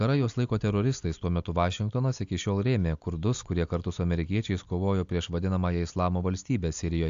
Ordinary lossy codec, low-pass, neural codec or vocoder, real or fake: AAC, 64 kbps; 7.2 kHz; none; real